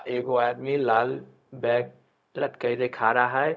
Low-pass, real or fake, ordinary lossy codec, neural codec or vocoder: none; fake; none; codec, 16 kHz, 0.4 kbps, LongCat-Audio-Codec